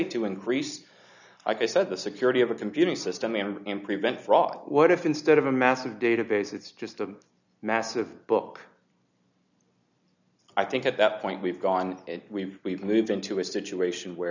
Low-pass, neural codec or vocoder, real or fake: 7.2 kHz; none; real